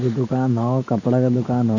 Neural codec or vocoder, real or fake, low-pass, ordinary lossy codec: none; real; 7.2 kHz; none